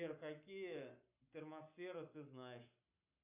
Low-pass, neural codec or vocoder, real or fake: 3.6 kHz; codec, 44.1 kHz, 7.8 kbps, Pupu-Codec; fake